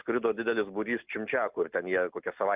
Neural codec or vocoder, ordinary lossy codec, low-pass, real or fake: none; Opus, 24 kbps; 3.6 kHz; real